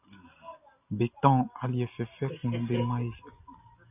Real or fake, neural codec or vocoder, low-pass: real; none; 3.6 kHz